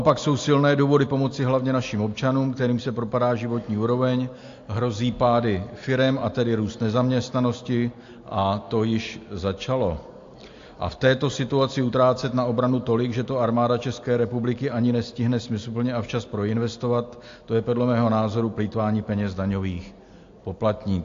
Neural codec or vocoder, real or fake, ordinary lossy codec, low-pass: none; real; AAC, 48 kbps; 7.2 kHz